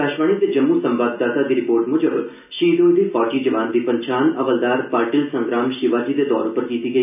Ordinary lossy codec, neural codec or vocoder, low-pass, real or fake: none; none; 3.6 kHz; real